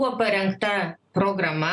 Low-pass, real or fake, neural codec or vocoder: 10.8 kHz; real; none